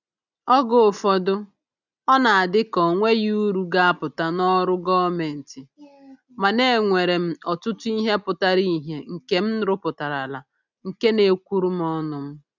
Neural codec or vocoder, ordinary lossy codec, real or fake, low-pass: none; none; real; 7.2 kHz